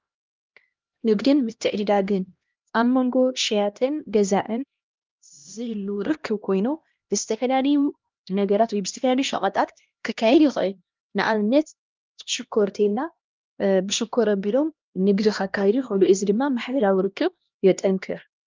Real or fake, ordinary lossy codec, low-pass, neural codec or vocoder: fake; Opus, 24 kbps; 7.2 kHz; codec, 16 kHz, 1 kbps, X-Codec, HuBERT features, trained on LibriSpeech